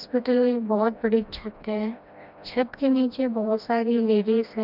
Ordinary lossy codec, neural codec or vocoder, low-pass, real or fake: none; codec, 16 kHz, 1 kbps, FreqCodec, smaller model; 5.4 kHz; fake